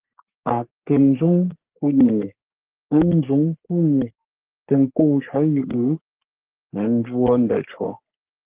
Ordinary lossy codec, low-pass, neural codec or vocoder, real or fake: Opus, 16 kbps; 3.6 kHz; codec, 44.1 kHz, 3.4 kbps, Pupu-Codec; fake